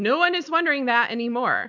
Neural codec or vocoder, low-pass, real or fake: none; 7.2 kHz; real